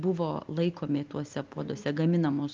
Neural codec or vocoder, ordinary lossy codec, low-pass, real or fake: none; Opus, 32 kbps; 7.2 kHz; real